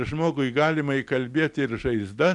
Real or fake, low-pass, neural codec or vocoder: real; 10.8 kHz; none